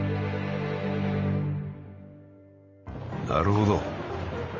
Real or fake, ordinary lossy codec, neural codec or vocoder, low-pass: fake; Opus, 32 kbps; vocoder, 44.1 kHz, 128 mel bands every 512 samples, BigVGAN v2; 7.2 kHz